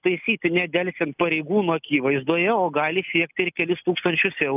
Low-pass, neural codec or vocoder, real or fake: 3.6 kHz; none; real